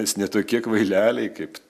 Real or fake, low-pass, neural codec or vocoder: real; 14.4 kHz; none